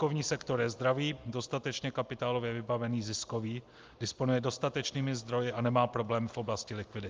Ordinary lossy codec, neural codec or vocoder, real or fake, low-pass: Opus, 32 kbps; none; real; 7.2 kHz